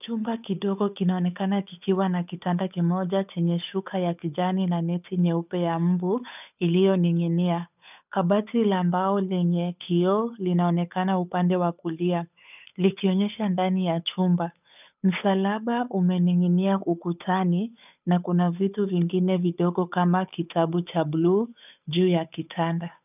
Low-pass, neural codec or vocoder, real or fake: 3.6 kHz; codec, 16 kHz, 8 kbps, FunCodec, trained on Chinese and English, 25 frames a second; fake